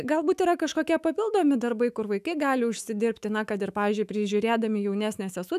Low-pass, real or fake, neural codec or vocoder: 14.4 kHz; fake; autoencoder, 48 kHz, 128 numbers a frame, DAC-VAE, trained on Japanese speech